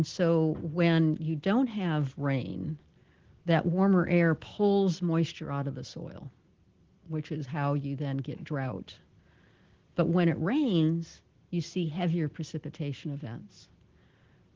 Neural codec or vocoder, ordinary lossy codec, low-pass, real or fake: none; Opus, 16 kbps; 7.2 kHz; real